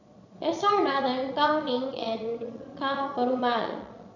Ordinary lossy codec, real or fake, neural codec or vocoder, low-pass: none; fake; vocoder, 22.05 kHz, 80 mel bands, Vocos; 7.2 kHz